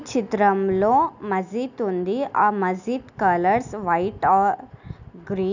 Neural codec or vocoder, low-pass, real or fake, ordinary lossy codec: none; 7.2 kHz; real; none